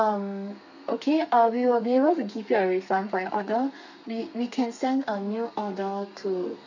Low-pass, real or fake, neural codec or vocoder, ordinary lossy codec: 7.2 kHz; fake; codec, 32 kHz, 1.9 kbps, SNAC; none